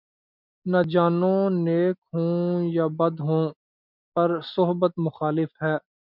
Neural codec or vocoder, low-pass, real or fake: none; 5.4 kHz; real